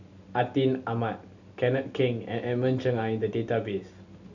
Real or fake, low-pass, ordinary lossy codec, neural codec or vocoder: real; 7.2 kHz; none; none